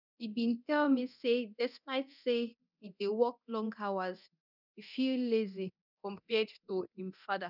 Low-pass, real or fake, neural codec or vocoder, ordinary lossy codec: 5.4 kHz; fake; codec, 24 kHz, 0.9 kbps, DualCodec; none